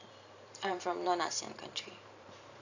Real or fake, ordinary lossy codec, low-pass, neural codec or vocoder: fake; AAC, 48 kbps; 7.2 kHz; vocoder, 44.1 kHz, 128 mel bands every 512 samples, BigVGAN v2